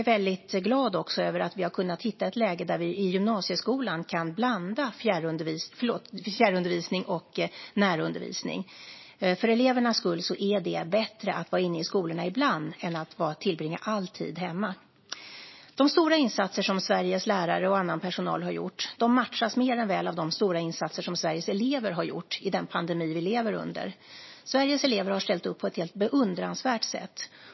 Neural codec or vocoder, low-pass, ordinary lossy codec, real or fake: none; 7.2 kHz; MP3, 24 kbps; real